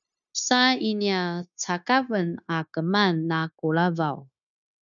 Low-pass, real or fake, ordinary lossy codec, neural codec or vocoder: 7.2 kHz; fake; MP3, 96 kbps; codec, 16 kHz, 0.9 kbps, LongCat-Audio-Codec